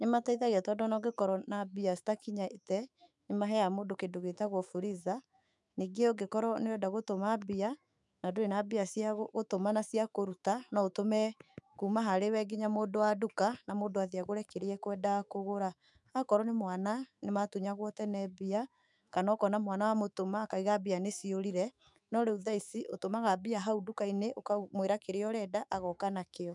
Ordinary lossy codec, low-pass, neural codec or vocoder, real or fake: none; 10.8 kHz; autoencoder, 48 kHz, 128 numbers a frame, DAC-VAE, trained on Japanese speech; fake